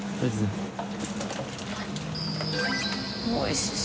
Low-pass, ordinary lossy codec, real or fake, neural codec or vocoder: none; none; real; none